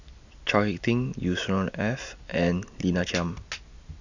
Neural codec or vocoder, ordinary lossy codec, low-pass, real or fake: none; none; 7.2 kHz; real